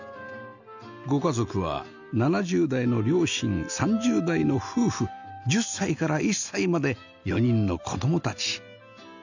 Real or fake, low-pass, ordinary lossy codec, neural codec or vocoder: real; 7.2 kHz; none; none